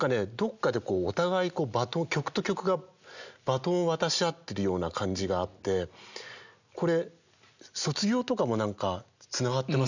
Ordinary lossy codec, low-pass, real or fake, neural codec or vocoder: none; 7.2 kHz; real; none